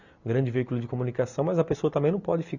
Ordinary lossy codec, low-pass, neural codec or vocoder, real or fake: none; 7.2 kHz; none; real